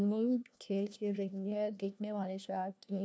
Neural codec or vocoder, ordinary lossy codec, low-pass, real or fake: codec, 16 kHz, 1 kbps, FunCodec, trained on LibriTTS, 50 frames a second; none; none; fake